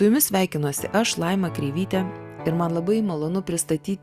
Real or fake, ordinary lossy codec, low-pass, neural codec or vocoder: real; Opus, 64 kbps; 14.4 kHz; none